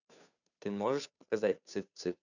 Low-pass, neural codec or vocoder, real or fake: 7.2 kHz; codec, 16 kHz, 2 kbps, FunCodec, trained on Chinese and English, 25 frames a second; fake